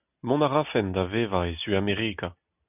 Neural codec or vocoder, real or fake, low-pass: none; real; 3.6 kHz